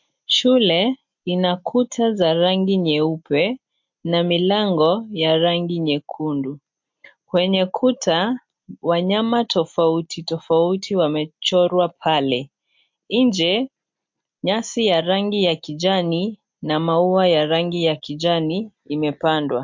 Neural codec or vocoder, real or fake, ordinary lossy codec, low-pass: none; real; MP3, 48 kbps; 7.2 kHz